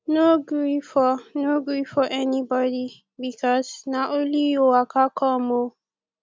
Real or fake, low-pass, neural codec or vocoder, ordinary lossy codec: real; none; none; none